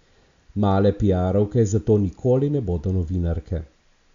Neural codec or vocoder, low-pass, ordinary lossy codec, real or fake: none; 7.2 kHz; Opus, 64 kbps; real